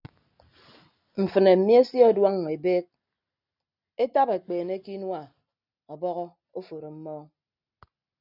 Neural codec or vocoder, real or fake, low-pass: none; real; 5.4 kHz